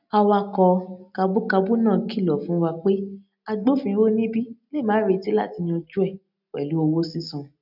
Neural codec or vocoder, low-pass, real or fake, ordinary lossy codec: none; 5.4 kHz; real; none